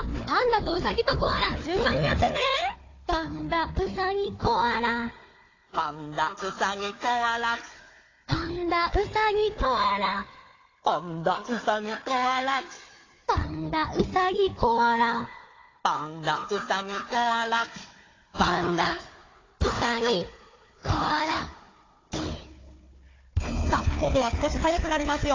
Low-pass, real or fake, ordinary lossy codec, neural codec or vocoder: 7.2 kHz; fake; AAC, 32 kbps; codec, 16 kHz, 4 kbps, FunCodec, trained on Chinese and English, 50 frames a second